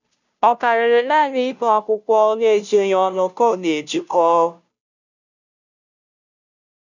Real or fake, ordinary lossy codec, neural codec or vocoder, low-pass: fake; none; codec, 16 kHz, 0.5 kbps, FunCodec, trained on Chinese and English, 25 frames a second; 7.2 kHz